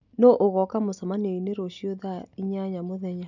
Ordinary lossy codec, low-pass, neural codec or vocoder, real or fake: none; 7.2 kHz; none; real